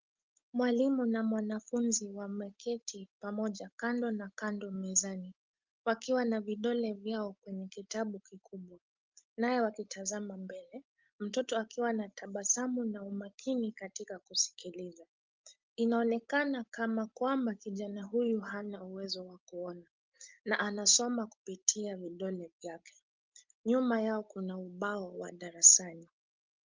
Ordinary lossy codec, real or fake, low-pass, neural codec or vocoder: Opus, 32 kbps; real; 7.2 kHz; none